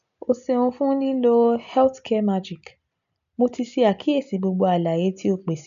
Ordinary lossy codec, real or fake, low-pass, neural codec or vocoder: none; real; 7.2 kHz; none